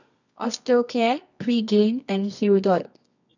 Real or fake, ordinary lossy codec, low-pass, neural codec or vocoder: fake; none; 7.2 kHz; codec, 24 kHz, 0.9 kbps, WavTokenizer, medium music audio release